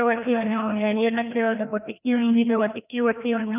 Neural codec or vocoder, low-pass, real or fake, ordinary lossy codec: codec, 16 kHz, 1 kbps, FreqCodec, larger model; 3.6 kHz; fake; none